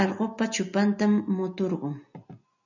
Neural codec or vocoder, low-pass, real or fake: none; 7.2 kHz; real